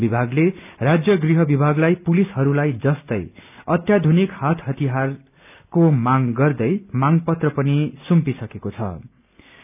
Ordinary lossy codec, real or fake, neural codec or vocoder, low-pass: none; real; none; 3.6 kHz